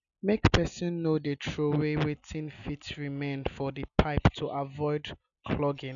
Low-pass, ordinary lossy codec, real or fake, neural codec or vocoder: 7.2 kHz; none; real; none